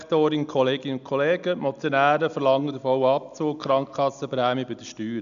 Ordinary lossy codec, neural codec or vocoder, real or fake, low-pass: none; none; real; 7.2 kHz